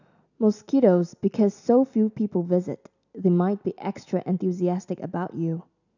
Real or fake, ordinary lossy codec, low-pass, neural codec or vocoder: real; none; 7.2 kHz; none